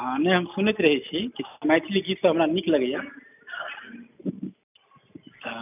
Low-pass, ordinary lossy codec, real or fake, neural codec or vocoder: 3.6 kHz; none; real; none